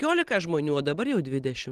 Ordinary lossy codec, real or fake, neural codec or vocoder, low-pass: Opus, 16 kbps; real; none; 14.4 kHz